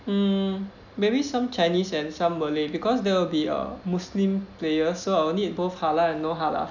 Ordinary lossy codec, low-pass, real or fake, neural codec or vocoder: none; 7.2 kHz; real; none